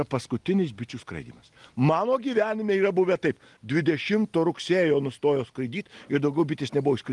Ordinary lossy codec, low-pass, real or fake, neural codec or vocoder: Opus, 24 kbps; 10.8 kHz; real; none